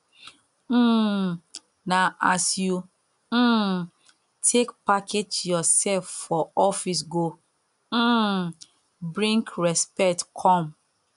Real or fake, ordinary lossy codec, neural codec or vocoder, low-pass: real; none; none; 10.8 kHz